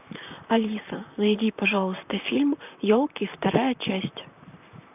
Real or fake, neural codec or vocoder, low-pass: fake; vocoder, 44.1 kHz, 128 mel bands, Pupu-Vocoder; 3.6 kHz